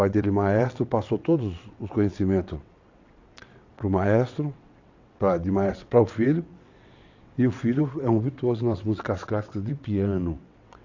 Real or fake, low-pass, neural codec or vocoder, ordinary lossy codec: fake; 7.2 kHz; vocoder, 22.05 kHz, 80 mel bands, WaveNeXt; AAC, 48 kbps